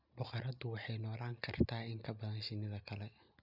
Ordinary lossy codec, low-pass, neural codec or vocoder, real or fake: none; 5.4 kHz; none; real